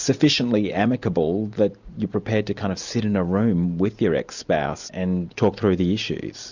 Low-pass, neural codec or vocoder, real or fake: 7.2 kHz; none; real